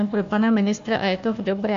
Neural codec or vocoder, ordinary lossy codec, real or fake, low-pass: codec, 16 kHz, 1 kbps, FunCodec, trained on Chinese and English, 50 frames a second; MP3, 64 kbps; fake; 7.2 kHz